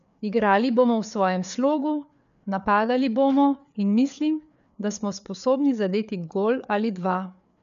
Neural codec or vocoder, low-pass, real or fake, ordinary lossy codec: codec, 16 kHz, 4 kbps, FreqCodec, larger model; 7.2 kHz; fake; none